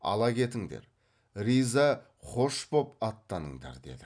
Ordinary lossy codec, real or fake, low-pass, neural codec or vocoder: none; real; 9.9 kHz; none